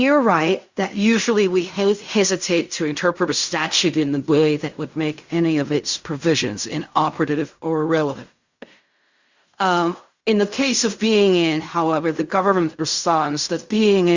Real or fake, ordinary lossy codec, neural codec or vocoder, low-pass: fake; Opus, 64 kbps; codec, 16 kHz in and 24 kHz out, 0.4 kbps, LongCat-Audio-Codec, fine tuned four codebook decoder; 7.2 kHz